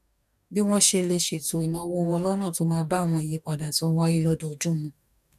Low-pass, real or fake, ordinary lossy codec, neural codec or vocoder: 14.4 kHz; fake; none; codec, 44.1 kHz, 2.6 kbps, DAC